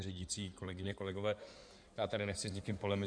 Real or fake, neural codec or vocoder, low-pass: fake; codec, 16 kHz in and 24 kHz out, 2.2 kbps, FireRedTTS-2 codec; 9.9 kHz